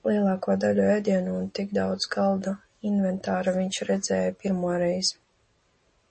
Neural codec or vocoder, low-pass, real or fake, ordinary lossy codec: none; 10.8 kHz; real; MP3, 32 kbps